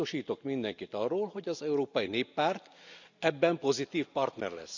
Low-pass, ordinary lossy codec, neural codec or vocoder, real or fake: 7.2 kHz; none; none; real